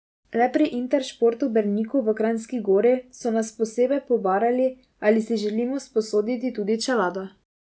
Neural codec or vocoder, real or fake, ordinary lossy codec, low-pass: none; real; none; none